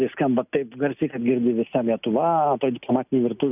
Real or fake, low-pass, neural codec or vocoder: real; 3.6 kHz; none